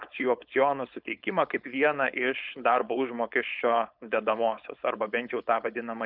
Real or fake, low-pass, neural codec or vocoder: fake; 5.4 kHz; codec, 16 kHz, 4.8 kbps, FACodec